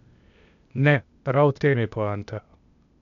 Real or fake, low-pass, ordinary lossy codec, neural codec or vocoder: fake; 7.2 kHz; none; codec, 16 kHz, 0.8 kbps, ZipCodec